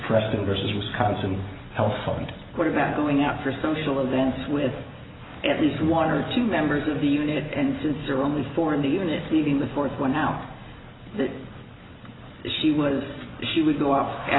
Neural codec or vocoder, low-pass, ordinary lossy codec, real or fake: none; 7.2 kHz; AAC, 16 kbps; real